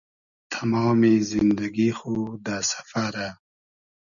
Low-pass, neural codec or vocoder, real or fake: 7.2 kHz; none; real